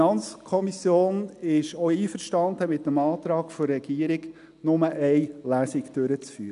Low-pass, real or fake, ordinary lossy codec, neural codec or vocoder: 10.8 kHz; real; none; none